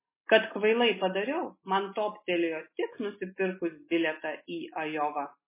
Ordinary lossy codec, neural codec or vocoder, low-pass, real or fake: MP3, 16 kbps; none; 3.6 kHz; real